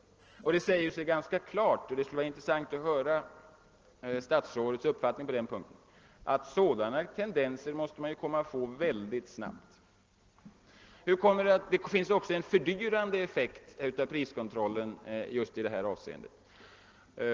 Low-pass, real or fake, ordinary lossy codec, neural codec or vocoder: 7.2 kHz; real; Opus, 16 kbps; none